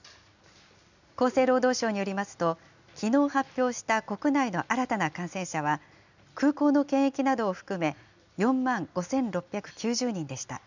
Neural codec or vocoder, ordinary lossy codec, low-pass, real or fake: none; none; 7.2 kHz; real